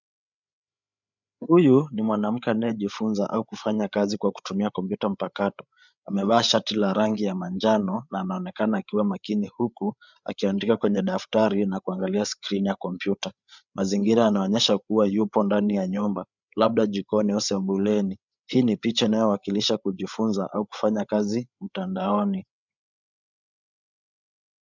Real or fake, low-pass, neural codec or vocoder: fake; 7.2 kHz; codec, 16 kHz, 8 kbps, FreqCodec, larger model